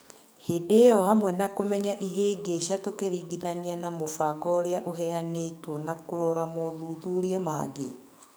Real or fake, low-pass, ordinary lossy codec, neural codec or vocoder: fake; none; none; codec, 44.1 kHz, 2.6 kbps, SNAC